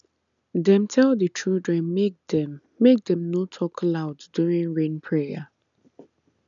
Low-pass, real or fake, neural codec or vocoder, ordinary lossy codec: 7.2 kHz; real; none; none